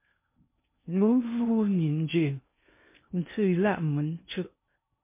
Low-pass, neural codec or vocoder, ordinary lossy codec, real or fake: 3.6 kHz; codec, 16 kHz in and 24 kHz out, 0.6 kbps, FocalCodec, streaming, 2048 codes; MP3, 24 kbps; fake